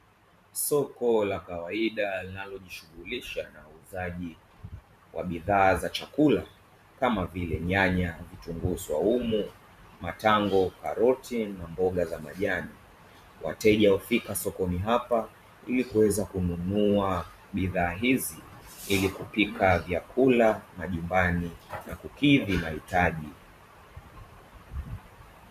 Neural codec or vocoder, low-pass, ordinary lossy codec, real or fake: vocoder, 44.1 kHz, 128 mel bands every 512 samples, BigVGAN v2; 14.4 kHz; MP3, 96 kbps; fake